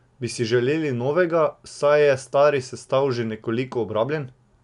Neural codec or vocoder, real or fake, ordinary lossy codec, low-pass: none; real; MP3, 96 kbps; 10.8 kHz